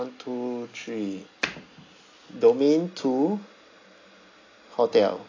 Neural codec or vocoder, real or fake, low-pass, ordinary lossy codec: none; real; 7.2 kHz; AAC, 32 kbps